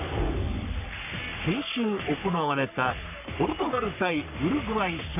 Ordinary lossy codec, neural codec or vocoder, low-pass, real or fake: none; codec, 44.1 kHz, 3.4 kbps, Pupu-Codec; 3.6 kHz; fake